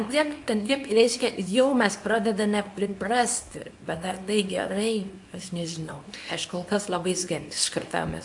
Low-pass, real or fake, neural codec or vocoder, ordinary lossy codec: 10.8 kHz; fake; codec, 24 kHz, 0.9 kbps, WavTokenizer, small release; AAC, 64 kbps